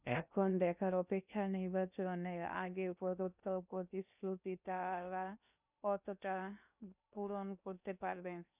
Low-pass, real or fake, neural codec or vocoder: 3.6 kHz; fake; codec, 16 kHz in and 24 kHz out, 0.6 kbps, FocalCodec, streaming, 2048 codes